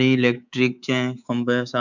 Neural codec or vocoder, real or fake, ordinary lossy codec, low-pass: codec, 24 kHz, 3.1 kbps, DualCodec; fake; none; 7.2 kHz